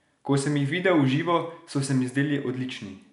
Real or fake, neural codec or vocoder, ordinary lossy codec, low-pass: real; none; none; 10.8 kHz